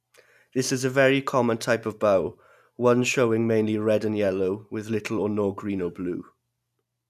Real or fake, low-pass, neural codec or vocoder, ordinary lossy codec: real; 14.4 kHz; none; none